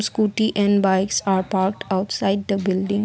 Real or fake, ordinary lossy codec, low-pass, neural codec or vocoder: real; none; none; none